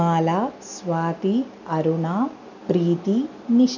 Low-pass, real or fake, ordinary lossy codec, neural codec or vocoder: 7.2 kHz; real; none; none